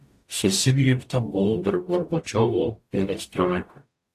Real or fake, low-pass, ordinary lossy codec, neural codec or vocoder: fake; 14.4 kHz; AAC, 64 kbps; codec, 44.1 kHz, 0.9 kbps, DAC